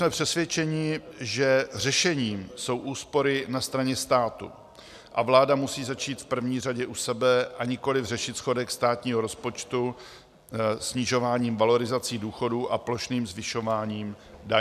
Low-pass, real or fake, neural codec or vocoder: 14.4 kHz; real; none